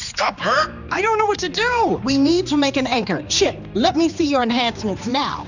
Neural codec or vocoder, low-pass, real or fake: codec, 16 kHz, 2 kbps, X-Codec, HuBERT features, trained on general audio; 7.2 kHz; fake